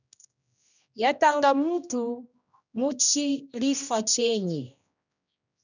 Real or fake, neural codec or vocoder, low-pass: fake; codec, 16 kHz, 1 kbps, X-Codec, HuBERT features, trained on general audio; 7.2 kHz